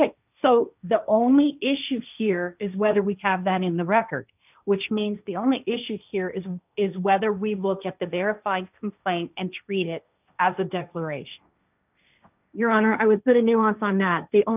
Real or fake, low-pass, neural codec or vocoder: fake; 3.6 kHz; codec, 16 kHz, 1.1 kbps, Voila-Tokenizer